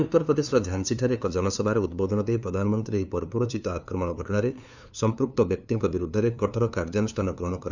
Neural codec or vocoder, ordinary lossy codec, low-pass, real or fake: codec, 16 kHz, 2 kbps, FunCodec, trained on LibriTTS, 25 frames a second; none; 7.2 kHz; fake